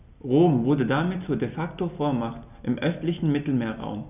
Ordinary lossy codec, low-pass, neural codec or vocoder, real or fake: none; 3.6 kHz; none; real